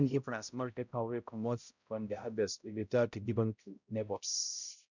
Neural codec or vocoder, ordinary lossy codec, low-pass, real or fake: codec, 16 kHz, 0.5 kbps, X-Codec, HuBERT features, trained on balanced general audio; none; 7.2 kHz; fake